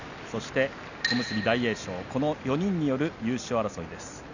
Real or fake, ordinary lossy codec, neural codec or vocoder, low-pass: real; none; none; 7.2 kHz